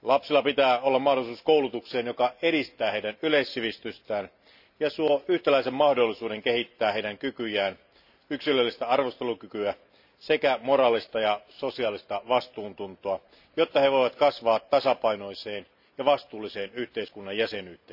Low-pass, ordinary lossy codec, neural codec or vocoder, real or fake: 5.4 kHz; none; none; real